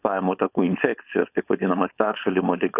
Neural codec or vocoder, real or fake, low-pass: codec, 16 kHz, 4.8 kbps, FACodec; fake; 3.6 kHz